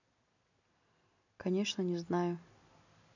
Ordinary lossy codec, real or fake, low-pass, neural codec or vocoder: none; real; 7.2 kHz; none